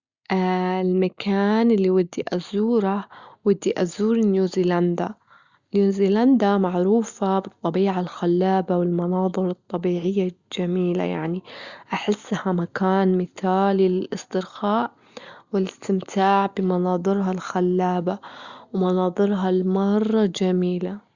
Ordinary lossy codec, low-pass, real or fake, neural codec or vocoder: Opus, 64 kbps; 7.2 kHz; real; none